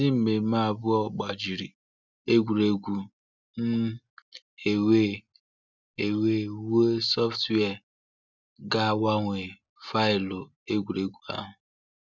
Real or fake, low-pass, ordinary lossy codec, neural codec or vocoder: real; 7.2 kHz; none; none